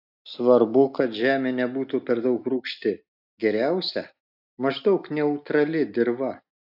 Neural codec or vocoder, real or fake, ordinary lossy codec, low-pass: none; real; AAC, 48 kbps; 5.4 kHz